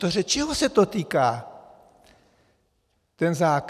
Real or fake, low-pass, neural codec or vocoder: real; 14.4 kHz; none